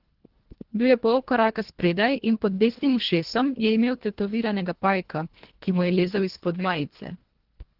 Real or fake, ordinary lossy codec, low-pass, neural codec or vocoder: fake; Opus, 16 kbps; 5.4 kHz; codec, 24 kHz, 1.5 kbps, HILCodec